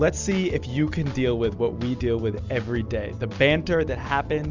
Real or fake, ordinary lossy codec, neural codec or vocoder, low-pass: real; Opus, 64 kbps; none; 7.2 kHz